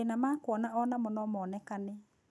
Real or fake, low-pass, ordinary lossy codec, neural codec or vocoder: real; none; none; none